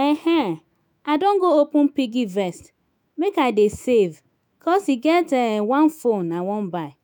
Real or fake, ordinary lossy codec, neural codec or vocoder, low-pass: fake; none; autoencoder, 48 kHz, 128 numbers a frame, DAC-VAE, trained on Japanese speech; none